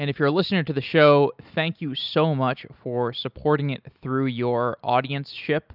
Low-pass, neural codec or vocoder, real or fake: 5.4 kHz; none; real